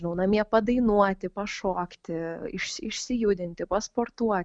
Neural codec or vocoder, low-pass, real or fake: none; 9.9 kHz; real